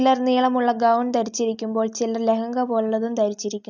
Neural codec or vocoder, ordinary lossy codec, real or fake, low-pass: none; none; real; 7.2 kHz